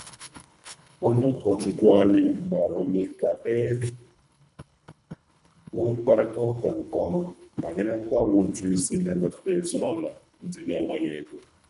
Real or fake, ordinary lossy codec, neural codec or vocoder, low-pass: fake; none; codec, 24 kHz, 1.5 kbps, HILCodec; 10.8 kHz